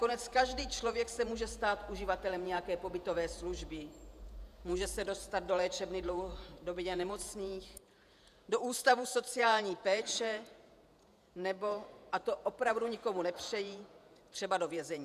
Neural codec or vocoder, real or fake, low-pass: vocoder, 48 kHz, 128 mel bands, Vocos; fake; 14.4 kHz